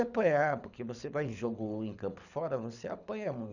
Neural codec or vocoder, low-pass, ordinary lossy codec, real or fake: codec, 24 kHz, 6 kbps, HILCodec; 7.2 kHz; none; fake